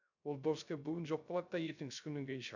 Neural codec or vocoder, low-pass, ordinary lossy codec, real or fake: codec, 16 kHz, 0.3 kbps, FocalCodec; 7.2 kHz; none; fake